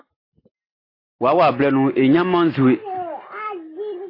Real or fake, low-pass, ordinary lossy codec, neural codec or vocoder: real; 5.4 kHz; AAC, 24 kbps; none